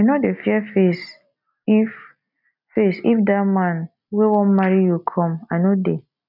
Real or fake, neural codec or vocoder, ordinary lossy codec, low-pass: real; none; none; 5.4 kHz